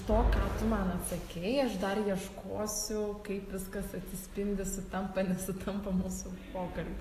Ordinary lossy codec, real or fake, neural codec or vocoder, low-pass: AAC, 48 kbps; real; none; 14.4 kHz